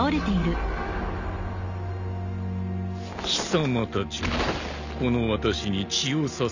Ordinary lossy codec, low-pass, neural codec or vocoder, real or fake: none; 7.2 kHz; none; real